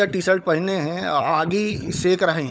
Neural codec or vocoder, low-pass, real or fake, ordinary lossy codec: codec, 16 kHz, 16 kbps, FunCodec, trained on LibriTTS, 50 frames a second; none; fake; none